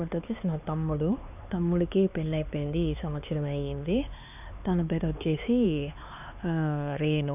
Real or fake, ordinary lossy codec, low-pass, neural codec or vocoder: fake; none; 3.6 kHz; codec, 16 kHz, 4 kbps, X-Codec, HuBERT features, trained on LibriSpeech